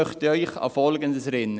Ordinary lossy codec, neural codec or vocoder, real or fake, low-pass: none; none; real; none